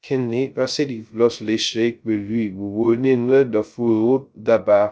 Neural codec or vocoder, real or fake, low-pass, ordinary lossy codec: codec, 16 kHz, 0.2 kbps, FocalCodec; fake; none; none